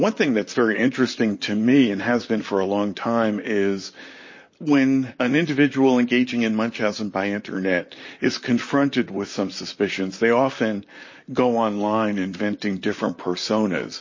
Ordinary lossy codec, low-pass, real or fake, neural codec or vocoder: MP3, 32 kbps; 7.2 kHz; fake; vocoder, 44.1 kHz, 128 mel bands, Pupu-Vocoder